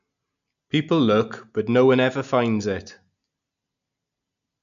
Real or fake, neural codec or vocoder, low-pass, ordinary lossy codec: real; none; 7.2 kHz; none